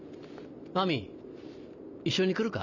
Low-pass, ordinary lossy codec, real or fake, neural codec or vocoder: 7.2 kHz; none; real; none